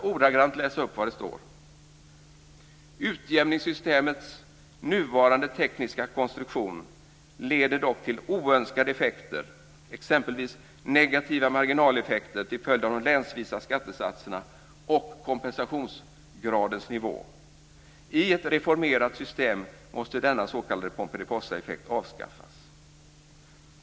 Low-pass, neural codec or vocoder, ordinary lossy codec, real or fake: none; none; none; real